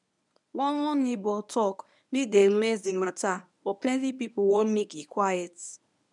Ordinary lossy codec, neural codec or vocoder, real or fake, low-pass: none; codec, 24 kHz, 0.9 kbps, WavTokenizer, medium speech release version 1; fake; 10.8 kHz